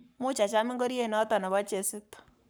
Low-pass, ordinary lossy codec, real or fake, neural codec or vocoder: none; none; fake; codec, 44.1 kHz, 7.8 kbps, Pupu-Codec